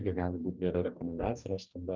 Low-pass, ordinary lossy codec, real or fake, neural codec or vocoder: 7.2 kHz; Opus, 32 kbps; fake; codec, 44.1 kHz, 2.6 kbps, DAC